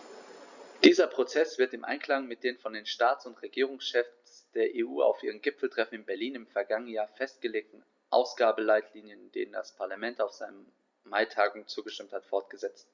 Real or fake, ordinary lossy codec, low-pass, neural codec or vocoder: real; Opus, 64 kbps; 7.2 kHz; none